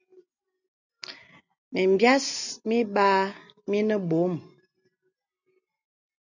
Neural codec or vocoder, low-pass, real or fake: none; 7.2 kHz; real